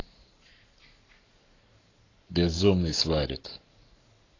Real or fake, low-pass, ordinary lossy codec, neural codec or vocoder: fake; 7.2 kHz; AAC, 32 kbps; codec, 44.1 kHz, 7.8 kbps, Pupu-Codec